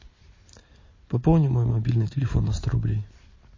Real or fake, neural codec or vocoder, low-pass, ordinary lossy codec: real; none; 7.2 kHz; MP3, 32 kbps